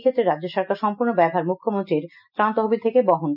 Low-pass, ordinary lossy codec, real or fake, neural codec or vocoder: 5.4 kHz; none; real; none